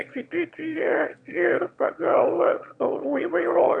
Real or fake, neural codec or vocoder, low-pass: fake; autoencoder, 22.05 kHz, a latent of 192 numbers a frame, VITS, trained on one speaker; 9.9 kHz